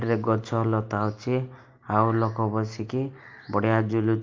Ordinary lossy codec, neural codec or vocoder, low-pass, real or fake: Opus, 24 kbps; none; 7.2 kHz; real